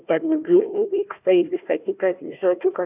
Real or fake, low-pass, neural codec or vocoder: fake; 3.6 kHz; codec, 16 kHz, 1 kbps, FunCodec, trained on Chinese and English, 50 frames a second